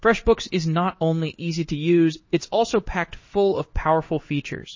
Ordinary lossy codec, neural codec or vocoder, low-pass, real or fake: MP3, 32 kbps; codec, 16 kHz in and 24 kHz out, 1 kbps, XY-Tokenizer; 7.2 kHz; fake